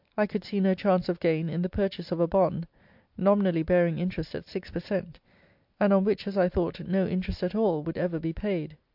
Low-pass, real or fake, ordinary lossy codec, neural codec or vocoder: 5.4 kHz; real; MP3, 48 kbps; none